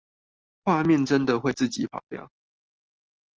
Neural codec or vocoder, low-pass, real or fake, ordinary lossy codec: none; 7.2 kHz; real; Opus, 24 kbps